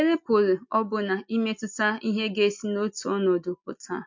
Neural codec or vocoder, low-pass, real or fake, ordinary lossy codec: none; 7.2 kHz; real; MP3, 48 kbps